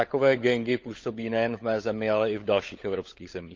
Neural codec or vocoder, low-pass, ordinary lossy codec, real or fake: codec, 16 kHz, 16 kbps, FunCodec, trained on LibriTTS, 50 frames a second; 7.2 kHz; Opus, 24 kbps; fake